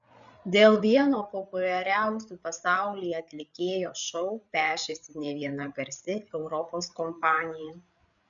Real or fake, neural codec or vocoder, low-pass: fake; codec, 16 kHz, 8 kbps, FreqCodec, larger model; 7.2 kHz